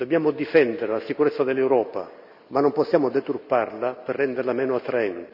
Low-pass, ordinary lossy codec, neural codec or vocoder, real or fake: 5.4 kHz; none; none; real